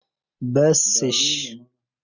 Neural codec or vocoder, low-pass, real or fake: none; 7.2 kHz; real